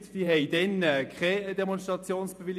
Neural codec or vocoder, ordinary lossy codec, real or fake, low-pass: vocoder, 48 kHz, 128 mel bands, Vocos; none; fake; 14.4 kHz